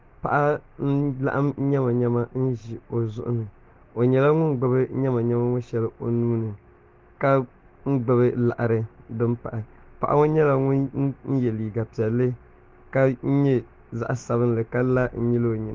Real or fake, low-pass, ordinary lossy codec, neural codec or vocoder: real; 7.2 kHz; Opus, 16 kbps; none